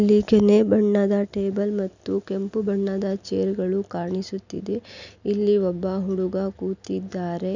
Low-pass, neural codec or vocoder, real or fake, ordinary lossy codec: 7.2 kHz; none; real; none